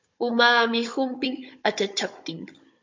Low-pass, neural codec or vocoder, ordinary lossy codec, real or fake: 7.2 kHz; codec, 16 kHz, 16 kbps, FunCodec, trained on Chinese and English, 50 frames a second; MP3, 48 kbps; fake